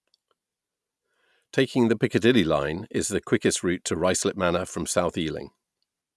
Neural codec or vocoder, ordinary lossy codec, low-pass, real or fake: none; none; none; real